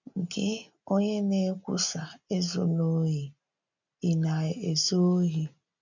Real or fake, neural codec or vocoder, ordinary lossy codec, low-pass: real; none; none; 7.2 kHz